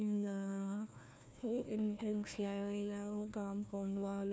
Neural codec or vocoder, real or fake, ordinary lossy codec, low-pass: codec, 16 kHz, 1 kbps, FunCodec, trained on Chinese and English, 50 frames a second; fake; none; none